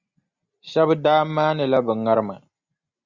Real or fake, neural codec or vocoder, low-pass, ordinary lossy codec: real; none; 7.2 kHz; Opus, 64 kbps